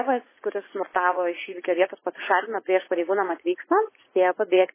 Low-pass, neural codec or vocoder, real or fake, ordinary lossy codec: 3.6 kHz; vocoder, 44.1 kHz, 80 mel bands, Vocos; fake; MP3, 16 kbps